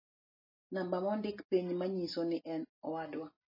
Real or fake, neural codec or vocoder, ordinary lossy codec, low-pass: real; none; MP3, 24 kbps; 5.4 kHz